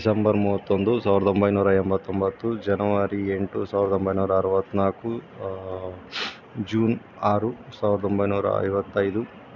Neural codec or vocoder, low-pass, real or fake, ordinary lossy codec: none; 7.2 kHz; real; none